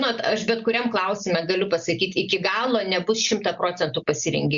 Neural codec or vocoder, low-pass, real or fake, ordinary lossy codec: none; 7.2 kHz; real; Opus, 64 kbps